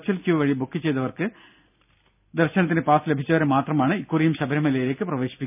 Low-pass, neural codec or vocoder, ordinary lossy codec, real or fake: 3.6 kHz; none; none; real